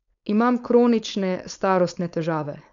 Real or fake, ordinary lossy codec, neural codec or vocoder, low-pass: fake; none; codec, 16 kHz, 4.8 kbps, FACodec; 7.2 kHz